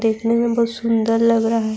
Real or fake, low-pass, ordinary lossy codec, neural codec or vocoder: real; none; none; none